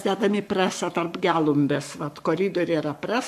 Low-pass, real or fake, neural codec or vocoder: 14.4 kHz; fake; codec, 44.1 kHz, 7.8 kbps, Pupu-Codec